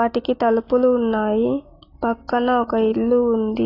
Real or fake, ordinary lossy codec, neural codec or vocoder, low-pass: real; AAC, 24 kbps; none; 5.4 kHz